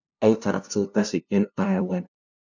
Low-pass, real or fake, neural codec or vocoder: 7.2 kHz; fake; codec, 16 kHz, 0.5 kbps, FunCodec, trained on LibriTTS, 25 frames a second